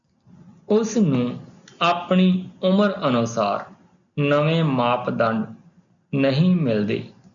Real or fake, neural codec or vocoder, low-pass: real; none; 7.2 kHz